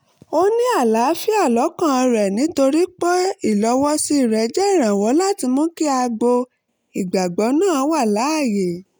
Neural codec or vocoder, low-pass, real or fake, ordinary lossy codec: none; none; real; none